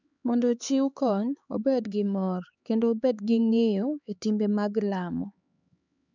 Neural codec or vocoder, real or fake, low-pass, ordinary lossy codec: codec, 16 kHz, 4 kbps, X-Codec, HuBERT features, trained on LibriSpeech; fake; 7.2 kHz; none